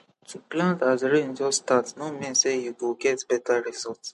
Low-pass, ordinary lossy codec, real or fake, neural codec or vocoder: 14.4 kHz; MP3, 48 kbps; real; none